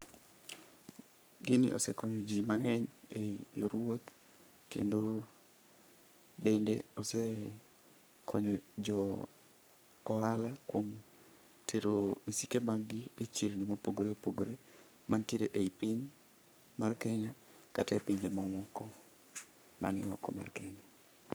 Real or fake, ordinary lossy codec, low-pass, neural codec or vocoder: fake; none; none; codec, 44.1 kHz, 3.4 kbps, Pupu-Codec